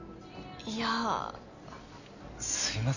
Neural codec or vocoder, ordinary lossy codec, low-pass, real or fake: none; none; 7.2 kHz; real